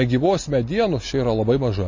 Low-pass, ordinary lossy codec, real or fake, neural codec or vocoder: 7.2 kHz; MP3, 32 kbps; real; none